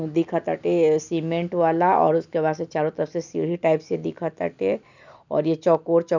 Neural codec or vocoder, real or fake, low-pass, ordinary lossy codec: none; real; 7.2 kHz; none